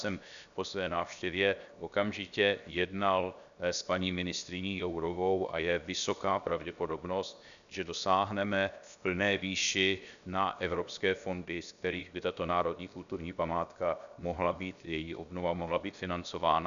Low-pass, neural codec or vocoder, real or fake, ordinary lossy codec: 7.2 kHz; codec, 16 kHz, 0.7 kbps, FocalCodec; fake; MP3, 96 kbps